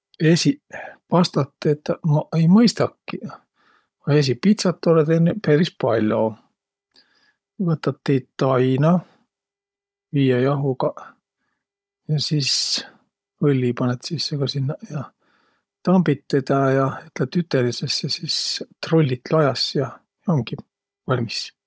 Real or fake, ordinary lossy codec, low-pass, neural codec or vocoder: fake; none; none; codec, 16 kHz, 16 kbps, FunCodec, trained on Chinese and English, 50 frames a second